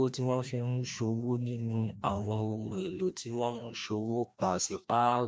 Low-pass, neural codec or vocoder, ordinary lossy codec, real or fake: none; codec, 16 kHz, 1 kbps, FreqCodec, larger model; none; fake